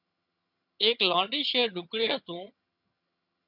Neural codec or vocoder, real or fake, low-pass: vocoder, 22.05 kHz, 80 mel bands, HiFi-GAN; fake; 5.4 kHz